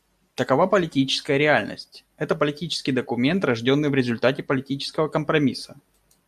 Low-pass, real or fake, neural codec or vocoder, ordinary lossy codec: 14.4 kHz; real; none; AAC, 96 kbps